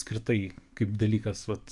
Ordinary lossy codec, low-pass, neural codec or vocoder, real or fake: MP3, 64 kbps; 10.8 kHz; codec, 44.1 kHz, 7.8 kbps, DAC; fake